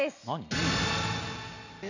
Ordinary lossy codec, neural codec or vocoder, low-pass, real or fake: none; none; 7.2 kHz; real